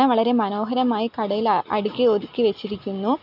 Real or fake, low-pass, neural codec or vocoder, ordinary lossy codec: real; 5.4 kHz; none; none